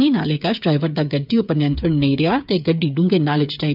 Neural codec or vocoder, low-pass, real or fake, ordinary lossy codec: codec, 16 kHz, 8 kbps, FreqCodec, smaller model; 5.4 kHz; fake; none